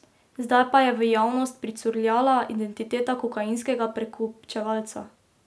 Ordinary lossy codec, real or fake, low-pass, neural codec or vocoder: none; real; none; none